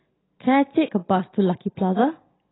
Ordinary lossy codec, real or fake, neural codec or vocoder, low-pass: AAC, 16 kbps; real; none; 7.2 kHz